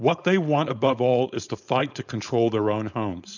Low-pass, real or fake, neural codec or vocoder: 7.2 kHz; fake; codec, 16 kHz, 4.8 kbps, FACodec